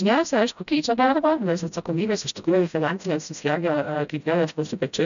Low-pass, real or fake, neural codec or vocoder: 7.2 kHz; fake; codec, 16 kHz, 0.5 kbps, FreqCodec, smaller model